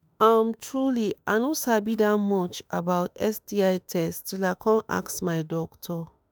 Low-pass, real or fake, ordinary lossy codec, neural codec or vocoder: none; fake; none; autoencoder, 48 kHz, 32 numbers a frame, DAC-VAE, trained on Japanese speech